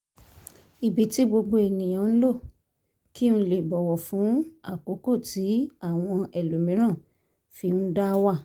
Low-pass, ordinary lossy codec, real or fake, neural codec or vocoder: 19.8 kHz; Opus, 24 kbps; real; none